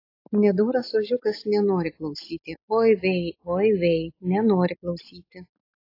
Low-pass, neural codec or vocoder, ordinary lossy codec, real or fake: 5.4 kHz; none; AAC, 32 kbps; real